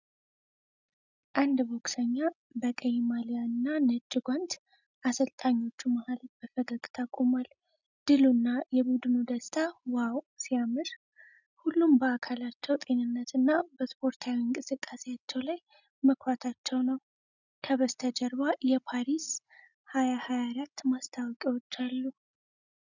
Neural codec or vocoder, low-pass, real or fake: none; 7.2 kHz; real